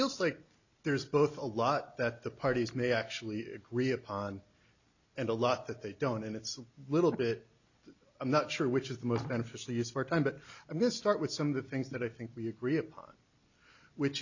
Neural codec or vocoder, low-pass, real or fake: none; 7.2 kHz; real